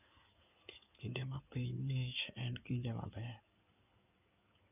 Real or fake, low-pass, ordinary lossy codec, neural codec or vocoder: fake; 3.6 kHz; none; codec, 16 kHz in and 24 kHz out, 2.2 kbps, FireRedTTS-2 codec